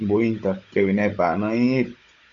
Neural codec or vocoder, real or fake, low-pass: codec, 16 kHz, 16 kbps, FunCodec, trained on Chinese and English, 50 frames a second; fake; 7.2 kHz